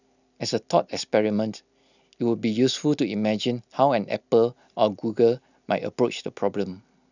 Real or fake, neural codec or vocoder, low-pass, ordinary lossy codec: real; none; 7.2 kHz; none